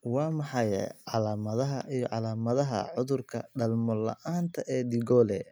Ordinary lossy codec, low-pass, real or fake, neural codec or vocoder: none; none; real; none